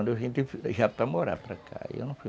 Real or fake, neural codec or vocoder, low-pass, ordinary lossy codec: real; none; none; none